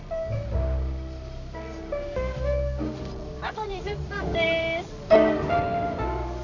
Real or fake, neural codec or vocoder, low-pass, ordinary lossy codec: fake; codec, 24 kHz, 0.9 kbps, WavTokenizer, medium music audio release; 7.2 kHz; none